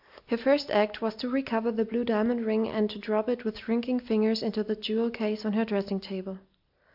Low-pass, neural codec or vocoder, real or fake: 5.4 kHz; none; real